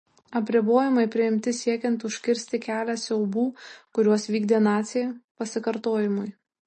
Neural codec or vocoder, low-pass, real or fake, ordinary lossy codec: none; 10.8 kHz; real; MP3, 32 kbps